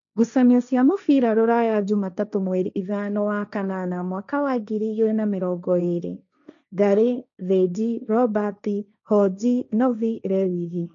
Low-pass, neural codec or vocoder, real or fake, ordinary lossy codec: 7.2 kHz; codec, 16 kHz, 1.1 kbps, Voila-Tokenizer; fake; none